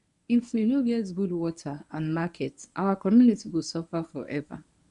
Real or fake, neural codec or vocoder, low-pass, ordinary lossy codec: fake; codec, 24 kHz, 0.9 kbps, WavTokenizer, medium speech release version 1; 10.8 kHz; MP3, 96 kbps